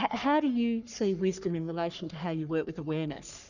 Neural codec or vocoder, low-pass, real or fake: codec, 44.1 kHz, 3.4 kbps, Pupu-Codec; 7.2 kHz; fake